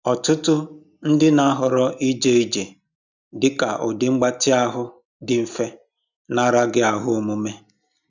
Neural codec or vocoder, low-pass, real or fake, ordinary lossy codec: none; 7.2 kHz; real; none